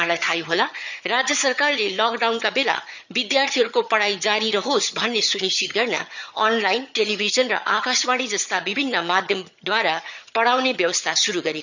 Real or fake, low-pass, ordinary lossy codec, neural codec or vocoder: fake; 7.2 kHz; none; vocoder, 22.05 kHz, 80 mel bands, HiFi-GAN